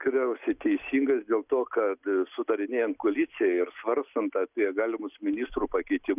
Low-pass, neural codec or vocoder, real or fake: 3.6 kHz; none; real